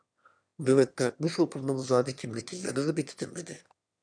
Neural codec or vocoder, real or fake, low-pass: autoencoder, 22.05 kHz, a latent of 192 numbers a frame, VITS, trained on one speaker; fake; 9.9 kHz